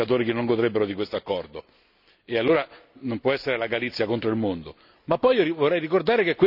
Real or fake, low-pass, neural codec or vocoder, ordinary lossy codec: real; 5.4 kHz; none; none